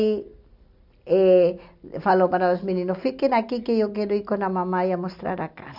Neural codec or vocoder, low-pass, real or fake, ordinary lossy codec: none; 5.4 kHz; real; none